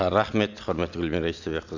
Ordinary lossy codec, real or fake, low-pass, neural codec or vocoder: none; real; 7.2 kHz; none